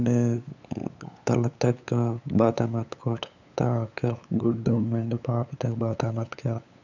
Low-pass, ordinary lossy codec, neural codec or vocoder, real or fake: 7.2 kHz; none; codec, 16 kHz, 8 kbps, FunCodec, trained on LibriTTS, 25 frames a second; fake